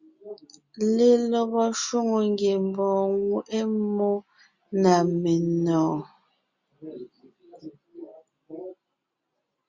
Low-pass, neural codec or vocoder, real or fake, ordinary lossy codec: 7.2 kHz; none; real; Opus, 64 kbps